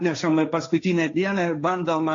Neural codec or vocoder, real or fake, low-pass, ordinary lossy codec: codec, 16 kHz, 1.1 kbps, Voila-Tokenizer; fake; 7.2 kHz; AAC, 48 kbps